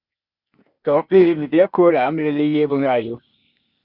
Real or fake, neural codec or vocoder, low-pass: fake; codec, 16 kHz, 0.8 kbps, ZipCodec; 5.4 kHz